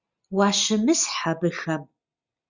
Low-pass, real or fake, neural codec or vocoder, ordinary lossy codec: 7.2 kHz; real; none; Opus, 64 kbps